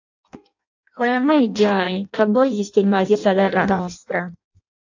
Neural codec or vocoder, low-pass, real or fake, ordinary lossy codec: codec, 16 kHz in and 24 kHz out, 0.6 kbps, FireRedTTS-2 codec; 7.2 kHz; fake; AAC, 48 kbps